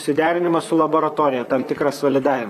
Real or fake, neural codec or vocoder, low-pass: fake; vocoder, 44.1 kHz, 128 mel bands, Pupu-Vocoder; 14.4 kHz